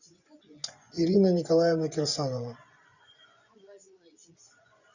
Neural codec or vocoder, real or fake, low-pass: vocoder, 24 kHz, 100 mel bands, Vocos; fake; 7.2 kHz